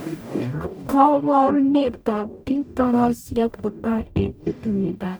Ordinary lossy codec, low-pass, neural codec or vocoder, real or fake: none; none; codec, 44.1 kHz, 0.9 kbps, DAC; fake